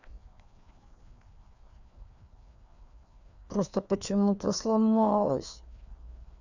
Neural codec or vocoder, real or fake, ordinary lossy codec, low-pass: codec, 16 kHz, 2 kbps, FreqCodec, larger model; fake; none; 7.2 kHz